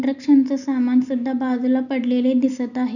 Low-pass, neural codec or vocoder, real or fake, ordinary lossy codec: 7.2 kHz; none; real; none